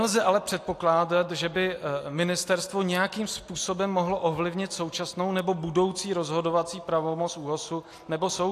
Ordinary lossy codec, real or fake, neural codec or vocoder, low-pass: AAC, 64 kbps; real; none; 14.4 kHz